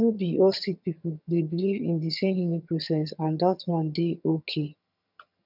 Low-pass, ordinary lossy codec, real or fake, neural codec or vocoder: 5.4 kHz; none; fake; vocoder, 22.05 kHz, 80 mel bands, HiFi-GAN